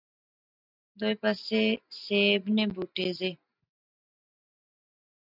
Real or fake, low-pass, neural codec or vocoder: real; 5.4 kHz; none